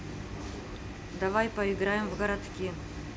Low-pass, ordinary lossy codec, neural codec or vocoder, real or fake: none; none; none; real